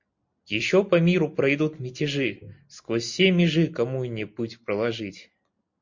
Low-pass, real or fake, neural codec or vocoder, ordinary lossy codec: 7.2 kHz; real; none; MP3, 48 kbps